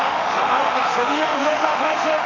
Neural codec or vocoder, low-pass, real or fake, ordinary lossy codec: autoencoder, 48 kHz, 32 numbers a frame, DAC-VAE, trained on Japanese speech; 7.2 kHz; fake; none